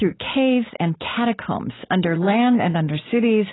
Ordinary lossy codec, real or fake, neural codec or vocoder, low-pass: AAC, 16 kbps; real; none; 7.2 kHz